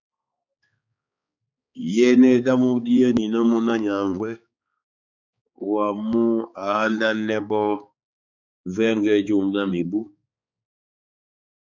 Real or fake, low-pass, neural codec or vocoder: fake; 7.2 kHz; codec, 16 kHz, 4 kbps, X-Codec, HuBERT features, trained on balanced general audio